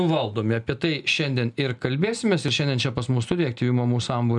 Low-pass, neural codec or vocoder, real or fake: 10.8 kHz; none; real